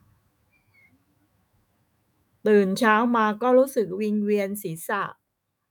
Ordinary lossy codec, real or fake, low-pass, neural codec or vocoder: none; fake; none; autoencoder, 48 kHz, 128 numbers a frame, DAC-VAE, trained on Japanese speech